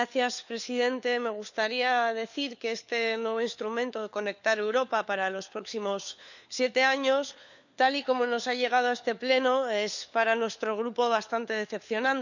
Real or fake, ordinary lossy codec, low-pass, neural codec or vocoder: fake; none; 7.2 kHz; codec, 16 kHz, 4 kbps, FunCodec, trained on LibriTTS, 50 frames a second